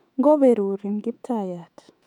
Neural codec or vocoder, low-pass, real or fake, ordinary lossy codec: autoencoder, 48 kHz, 128 numbers a frame, DAC-VAE, trained on Japanese speech; 19.8 kHz; fake; none